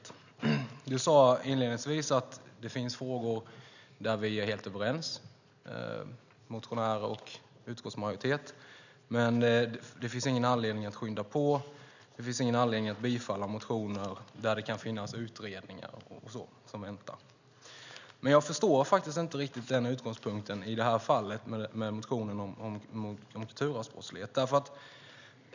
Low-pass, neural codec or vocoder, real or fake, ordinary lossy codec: 7.2 kHz; none; real; none